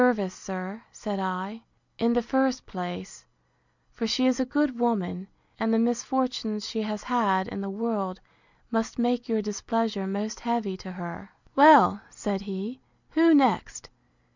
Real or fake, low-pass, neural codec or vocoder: real; 7.2 kHz; none